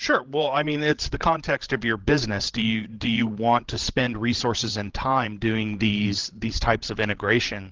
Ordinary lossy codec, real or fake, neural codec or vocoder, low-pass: Opus, 16 kbps; fake; codec, 16 kHz, 16 kbps, FreqCodec, larger model; 7.2 kHz